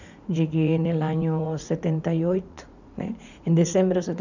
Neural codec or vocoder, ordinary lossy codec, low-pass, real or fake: vocoder, 44.1 kHz, 80 mel bands, Vocos; none; 7.2 kHz; fake